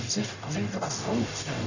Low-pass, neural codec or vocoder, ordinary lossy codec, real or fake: 7.2 kHz; codec, 44.1 kHz, 0.9 kbps, DAC; none; fake